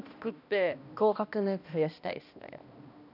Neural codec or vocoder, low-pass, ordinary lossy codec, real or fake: codec, 16 kHz, 1 kbps, X-Codec, HuBERT features, trained on balanced general audio; 5.4 kHz; none; fake